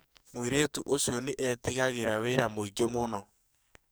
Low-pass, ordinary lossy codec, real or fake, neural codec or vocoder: none; none; fake; codec, 44.1 kHz, 2.6 kbps, SNAC